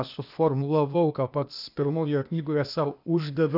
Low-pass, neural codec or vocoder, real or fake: 5.4 kHz; codec, 16 kHz, 0.8 kbps, ZipCodec; fake